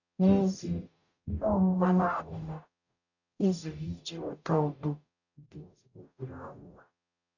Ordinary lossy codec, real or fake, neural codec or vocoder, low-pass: none; fake; codec, 44.1 kHz, 0.9 kbps, DAC; 7.2 kHz